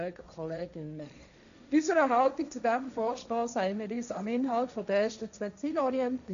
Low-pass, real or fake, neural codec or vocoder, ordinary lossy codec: 7.2 kHz; fake; codec, 16 kHz, 1.1 kbps, Voila-Tokenizer; none